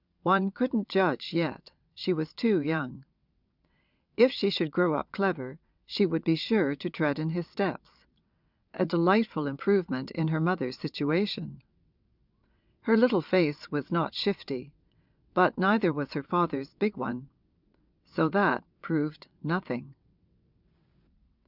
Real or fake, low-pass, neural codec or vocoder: fake; 5.4 kHz; vocoder, 22.05 kHz, 80 mel bands, WaveNeXt